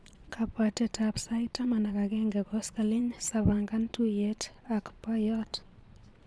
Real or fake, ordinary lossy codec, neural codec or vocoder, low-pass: fake; none; vocoder, 22.05 kHz, 80 mel bands, Vocos; none